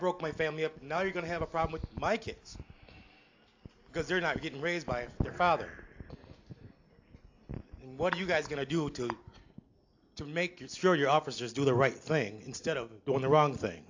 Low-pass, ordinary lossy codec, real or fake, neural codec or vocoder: 7.2 kHz; AAC, 48 kbps; real; none